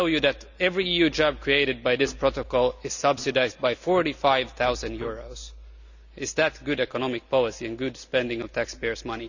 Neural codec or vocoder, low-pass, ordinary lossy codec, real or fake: none; 7.2 kHz; none; real